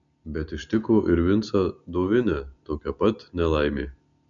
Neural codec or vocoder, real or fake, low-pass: none; real; 7.2 kHz